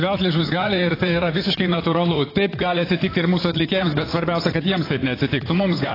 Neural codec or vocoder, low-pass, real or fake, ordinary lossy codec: vocoder, 44.1 kHz, 128 mel bands, Pupu-Vocoder; 5.4 kHz; fake; AAC, 24 kbps